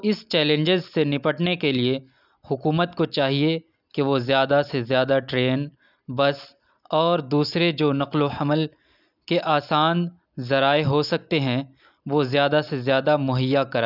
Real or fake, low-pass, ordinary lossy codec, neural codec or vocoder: real; 5.4 kHz; none; none